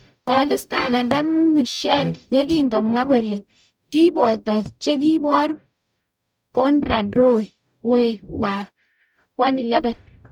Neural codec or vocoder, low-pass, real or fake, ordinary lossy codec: codec, 44.1 kHz, 0.9 kbps, DAC; 19.8 kHz; fake; none